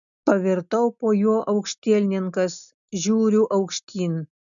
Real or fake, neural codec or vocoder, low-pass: real; none; 7.2 kHz